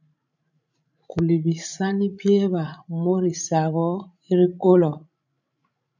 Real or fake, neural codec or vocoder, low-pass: fake; codec, 16 kHz, 16 kbps, FreqCodec, larger model; 7.2 kHz